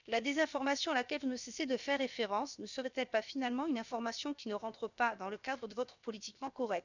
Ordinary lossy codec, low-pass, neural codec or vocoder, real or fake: none; 7.2 kHz; codec, 16 kHz, about 1 kbps, DyCAST, with the encoder's durations; fake